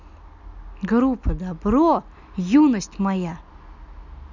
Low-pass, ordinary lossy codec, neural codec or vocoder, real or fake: 7.2 kHz; none; none; real